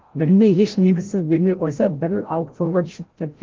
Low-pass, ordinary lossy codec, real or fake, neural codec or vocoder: 7.2 kHz; Opus, 16 kbps; fake; codec, 16 kHz, 0.5 kbps, FreqCodec, larger model